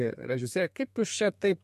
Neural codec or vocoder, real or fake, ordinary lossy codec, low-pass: codec, 32 kHz, 1.9 kbps, SNAC; fake; MP3, 64 kbps; 14.4 kHz